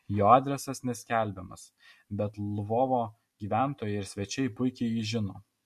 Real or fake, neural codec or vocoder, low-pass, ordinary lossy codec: real; none; 14.4 kHz; MP3, 64 kbps